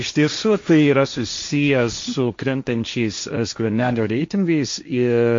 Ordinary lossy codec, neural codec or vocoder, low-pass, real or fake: MP3, 48 kbps; codec, 16 kHz, 1.1 kbps, Voila-Tokenizer; 7.2 kHz; fake